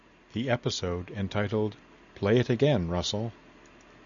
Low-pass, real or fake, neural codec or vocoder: 7.2 kHz; real; none